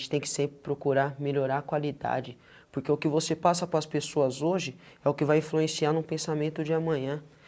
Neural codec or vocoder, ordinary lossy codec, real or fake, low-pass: none; none; real; none